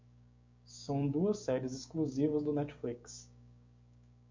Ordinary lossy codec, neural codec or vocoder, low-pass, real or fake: MP3, 48 kbps; codec, 16 kHz, 6 kbps, DAC; 7.2 kHz; fake